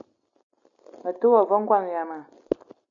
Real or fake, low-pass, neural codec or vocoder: real; 7.2 kHz; none